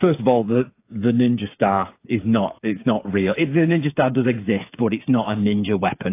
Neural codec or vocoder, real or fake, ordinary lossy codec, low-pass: codec, 16 kHz, 8 kbps, FreqCodec, smaller model; fake; AAC, 24 kbps; 3.6 kHz